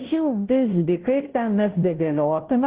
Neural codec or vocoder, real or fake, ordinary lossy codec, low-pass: codec, 16 kHz, 0.5 kbps, FunCodec, trained on Chinese and English, 25 frames a second; fake; Opus, 16 kbps; 3.6 kHz